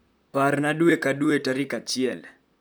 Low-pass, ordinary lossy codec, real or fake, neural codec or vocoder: none; none; fake; vocoder, 44.1 kHz, 128 mel bands, Pupu-Vocoder